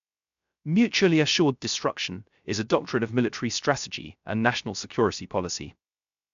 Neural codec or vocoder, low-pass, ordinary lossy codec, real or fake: codec, 16 kHz, 0.3 kbps, FocalCodec; 7.2 kHz; MP3, 64 kbps; fake